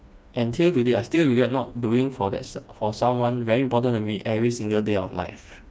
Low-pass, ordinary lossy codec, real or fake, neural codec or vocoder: none; none; fake; codec, 16 kHz, 2 kbps, FreqCodec, smaller model